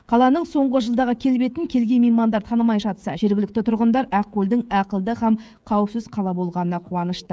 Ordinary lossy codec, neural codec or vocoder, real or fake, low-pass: none; codec, 16 kHz, 16 kbps, FreqCodec, smaller model; fake; none